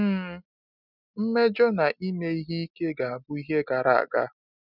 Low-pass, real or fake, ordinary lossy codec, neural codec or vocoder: 5.4 kHz; real; none; none